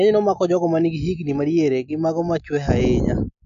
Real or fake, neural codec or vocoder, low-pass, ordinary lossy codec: real; none; 7.2 kHz; none